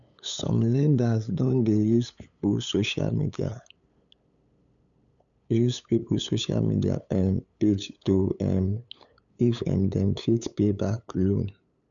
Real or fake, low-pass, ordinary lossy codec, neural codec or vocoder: fake; 7.2 kHz; none; codec, 16 kHz, 8 kbps, FunCodec, trained on LibriTTS, 25 frames a second